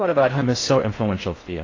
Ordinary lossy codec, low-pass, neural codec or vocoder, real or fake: AAC, 32 kbps; 7.2 kHz; codec, 16 kHz in and 24 kHz out, 0.8 kbps, FocalCodec, streaming, 65536 codes; fake